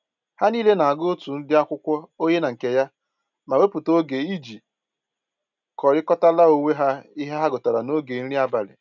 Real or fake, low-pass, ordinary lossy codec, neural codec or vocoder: real; 7.2 kHz; none; none